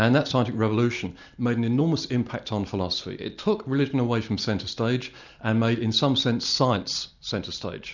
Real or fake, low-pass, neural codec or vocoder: real; 7.2 kHz; none